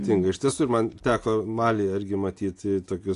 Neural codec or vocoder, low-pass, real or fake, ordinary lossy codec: none; 10.8 kHz; real; AAC, 48 kbps